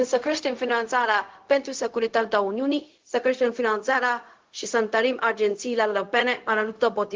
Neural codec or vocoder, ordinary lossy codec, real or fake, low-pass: codec, 16 kHz, 0.4 kbps, LongCat-Audio-Codec; Opus, 16 kbps; fake; 7.2 kHz